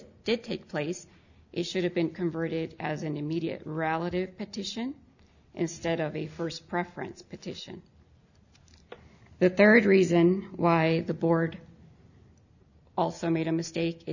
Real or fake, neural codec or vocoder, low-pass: real; none; 7.2 kHz